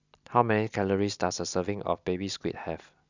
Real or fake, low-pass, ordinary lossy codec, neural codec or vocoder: real; 7.2 kHz; none; none